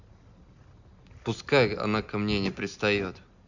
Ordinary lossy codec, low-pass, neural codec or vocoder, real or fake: none; 7.2 kHz; none; real